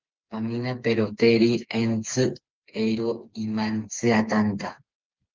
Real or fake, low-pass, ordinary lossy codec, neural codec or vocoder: fake; 7.2 kHz; Opus, 32 kbps; codec, 16 kHz, 4 kbps, FreqCodec, smaller model